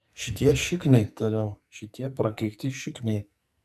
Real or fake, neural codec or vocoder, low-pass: fake; codec, 44.1 kHz, 2.6 kbps, SNAC; 14.4 kHz